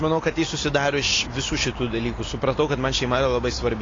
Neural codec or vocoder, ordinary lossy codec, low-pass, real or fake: none; AAC, 32 kbps; 7.2 kHz; real